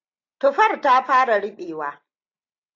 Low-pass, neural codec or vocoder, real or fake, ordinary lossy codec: 7.2 kHz; none; real; AAC, 48 kbps